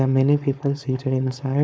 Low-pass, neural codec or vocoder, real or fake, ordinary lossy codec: none; codec, 16 kHz, 4.8 kbps, FACodec; fake; none